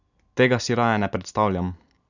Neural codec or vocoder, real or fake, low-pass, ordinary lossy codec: none; real; 7.2 kHz; none